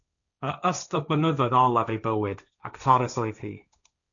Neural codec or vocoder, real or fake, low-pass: codec, 16 kHz, 1.1 kbps, Voila-Tokenizer; fake; 7.2 kHz